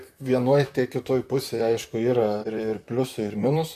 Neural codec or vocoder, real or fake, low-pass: vocoder, 44.1 kHz, 128 mel bands, Pupu-Vocoder; fake; 14.4 kHz